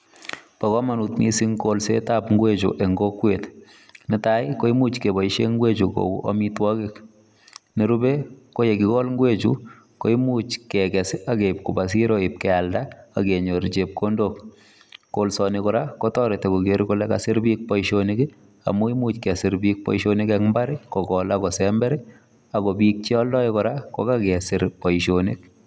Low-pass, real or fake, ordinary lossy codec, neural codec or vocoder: none; real; none; none